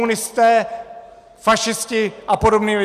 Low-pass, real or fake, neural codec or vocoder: 14.4 kHz; real; none